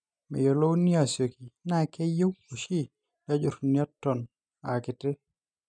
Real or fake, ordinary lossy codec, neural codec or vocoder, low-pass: real; none; none; 9.9 kHz